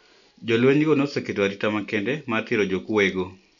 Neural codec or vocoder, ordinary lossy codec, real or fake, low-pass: none; none; real; 7.2 kHz